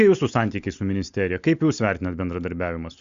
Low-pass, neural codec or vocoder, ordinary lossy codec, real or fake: 7.2 kHz; none; Opus, 64 kbps; real